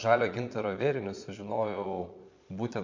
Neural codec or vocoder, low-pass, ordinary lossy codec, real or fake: vocoder, 22.05 kHz, 80 mel bands, Vocos; 7.2 kHz; MP3, 48 kbps; fake